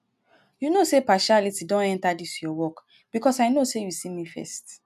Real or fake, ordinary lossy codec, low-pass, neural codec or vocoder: real; none; 14.4 kHz; none